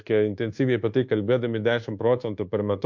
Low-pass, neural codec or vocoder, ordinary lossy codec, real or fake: 7.2 kHz; codec, 24 kHz, 1.2 kbps, DualCodec; MP3, 48 kbps; fake